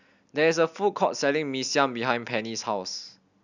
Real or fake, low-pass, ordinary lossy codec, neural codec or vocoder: real; 7.2 kHz; none; none